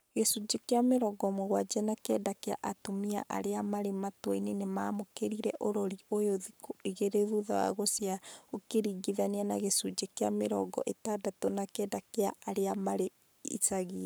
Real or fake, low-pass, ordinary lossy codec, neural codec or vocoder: fake; none; none; codec, 44.1 kHz, 7.8 kbps, Pupu-Codec